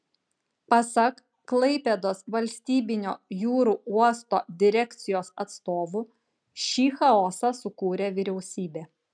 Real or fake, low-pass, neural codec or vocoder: real; 9.9 kHz; none